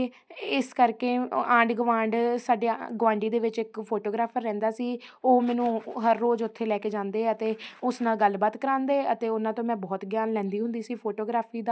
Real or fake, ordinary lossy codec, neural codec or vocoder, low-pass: real; none; none; none